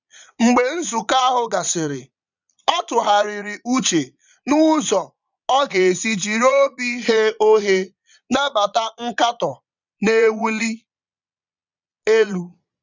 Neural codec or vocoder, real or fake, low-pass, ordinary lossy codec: vocoder, 22.05 kHz, 80 mel bands, Vocos; fake; 7.2 kHz; none